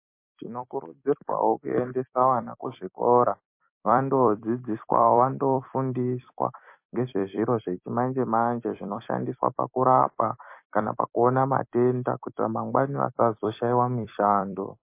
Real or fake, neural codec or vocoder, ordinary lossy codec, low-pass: fake; vocoder, 44.1 kHz, 128 mel bands every 256 samples, BigVGAN v2; MP3, 24 kbps; 3.6 kHz